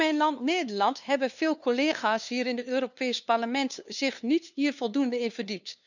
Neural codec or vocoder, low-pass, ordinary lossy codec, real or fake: codec, 16 kHz, 2 kbps, FunCodec, trained on LibriTTS, 25 frames a second; 7.2 kHz; none; fake